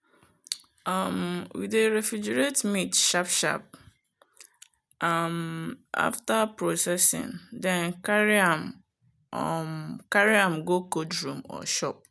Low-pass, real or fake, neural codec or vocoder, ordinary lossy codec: none; real; none; none